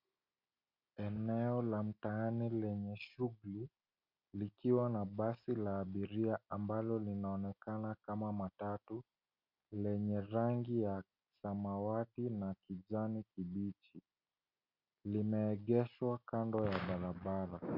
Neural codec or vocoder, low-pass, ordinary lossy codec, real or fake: none; 5.4 kHz; MP3, 48 kbps; real